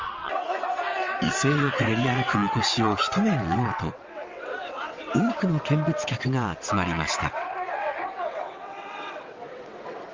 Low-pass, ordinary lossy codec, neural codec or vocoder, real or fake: 7.2 kHz; Opus, 32 kbps; vocoder, 22.05 kHz, 80 mel bands, WaveNeXt; fake